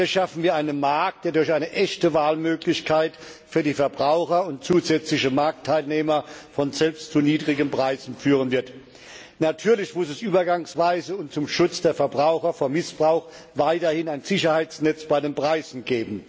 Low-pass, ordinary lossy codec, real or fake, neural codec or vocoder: none; none; real; none